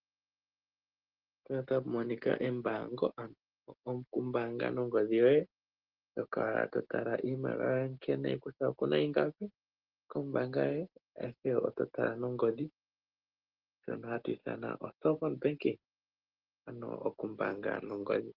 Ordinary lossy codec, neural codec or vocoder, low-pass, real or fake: Opus, 16 kbps; none; 5.4 kHz; real